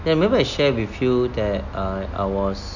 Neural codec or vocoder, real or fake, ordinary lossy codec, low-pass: none; real; none; 7.2 kHz